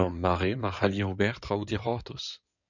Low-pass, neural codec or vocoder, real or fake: 7.2 kHz; codec, 16 kHz in and 24 kHz out, 2.2 kbps, FireRedTTS-2 codec; fake